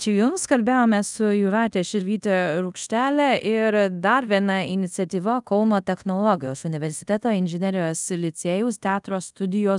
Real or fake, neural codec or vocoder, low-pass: fake; codec, 24 kHz, 0.5 kbps, DualCodec; 10.8 kHz